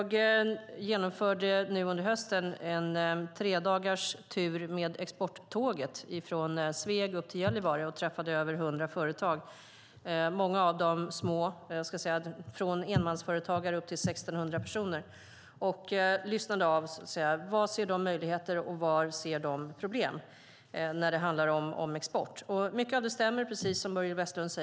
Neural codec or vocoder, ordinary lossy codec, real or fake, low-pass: none; none; real; none